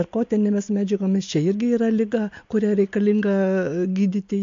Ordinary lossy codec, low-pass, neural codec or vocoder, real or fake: MP3, 48 kbps; 7.2 kHz; none; real